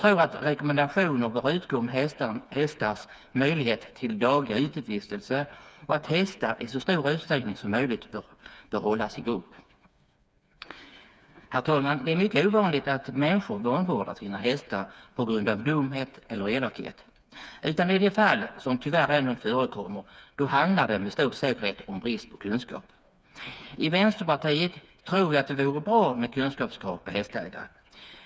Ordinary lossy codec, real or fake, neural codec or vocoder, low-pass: none; fake; codec, 16 kHz, 4 kbps, FreqCodec, smaller model; none